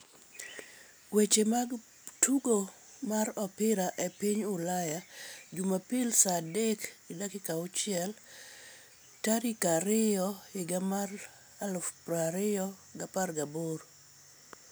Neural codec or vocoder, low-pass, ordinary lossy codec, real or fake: none; none; none; real